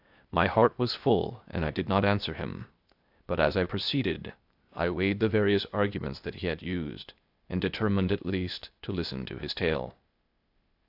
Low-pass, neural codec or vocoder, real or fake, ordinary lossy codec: 5.4 kHz; codec, 16 kHz, 0.8 kbps, ZipCodec; fake; AAC, 48 kbps